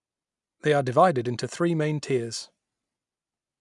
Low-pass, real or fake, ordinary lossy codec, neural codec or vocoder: 10.8 kHz; real; none; none